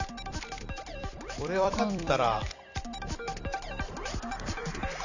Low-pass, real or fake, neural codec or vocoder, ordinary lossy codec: 7.2 kHz; fake; vocoder, 44.1 kHz, 128 mel bands every 256 samples, BigVGAN v2; none